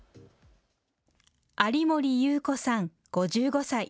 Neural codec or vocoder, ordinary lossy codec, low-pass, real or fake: none; none; none; real